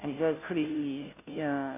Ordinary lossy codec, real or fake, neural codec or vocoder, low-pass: none; fake; codec, 16 kHz, 0.5 kbps, FunCodec, trained on Chinese and English, 25 frames a second; 3.6 kHz